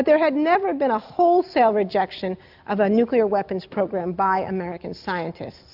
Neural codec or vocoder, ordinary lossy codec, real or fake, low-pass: none; AAC, 48 kbps; real; 5.4 kHz